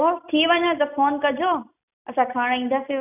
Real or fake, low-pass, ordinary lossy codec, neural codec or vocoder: real; 3.6 kHz; none; none